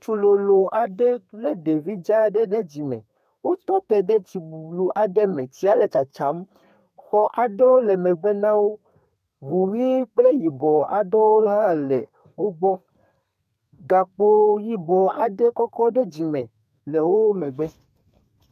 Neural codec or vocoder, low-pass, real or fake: codec, 32 kHz, 1.9 kbps, SNAC; 14.4 kHz; fake